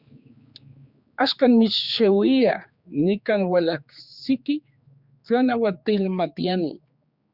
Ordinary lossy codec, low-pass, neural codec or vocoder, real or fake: Opus, 64 kbps; 5.4 kHz; codec, 16 kHz, 4 kbps, X-Codec, HuBERT features, trained on general audio; fake